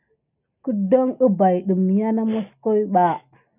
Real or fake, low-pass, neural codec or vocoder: real; 3.6 kHz; none